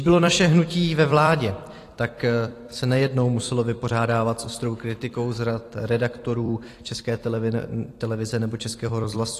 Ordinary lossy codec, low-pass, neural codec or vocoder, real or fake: AAC, 48 kbps; 14.4 kHz; vocoder, 44.1 kHz, 128 mel bands every 256 samples, BigVGAN v2; fake